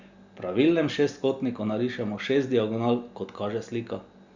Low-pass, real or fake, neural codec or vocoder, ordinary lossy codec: 7.2 kHz; real; none; Opus, 64 kbps